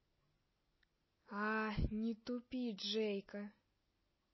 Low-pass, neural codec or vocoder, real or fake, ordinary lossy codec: 7.2 kHz; none; real; MP3, 24 kbps